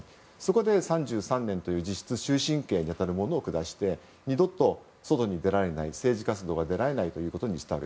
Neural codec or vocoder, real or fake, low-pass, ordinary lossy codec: none; real; none; none